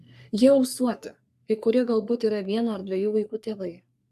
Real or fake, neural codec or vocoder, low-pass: fake; codec, 44.1 kHz, 3.4 kbps, Pupu-Codec; 14.4 kHz